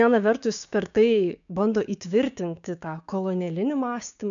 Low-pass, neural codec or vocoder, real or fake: 7.2 kHz; codec, 16 kHz, 6 kbps, DAC; fake